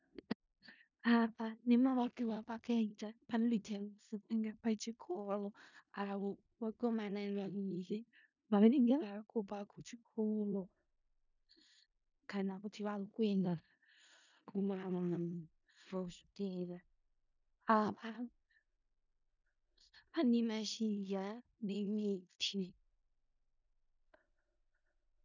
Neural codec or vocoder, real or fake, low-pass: codec, 16 kHz in and 24 kHz out, 0.4 kbps, LongCat-Audio-Codec, four codebook decoder; fake; 7.2 kHz